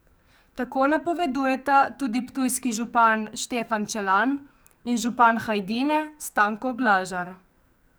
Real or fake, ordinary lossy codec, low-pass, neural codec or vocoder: fake; none; none; codec, 44.1 kHz, 2.6 kbps, SNAC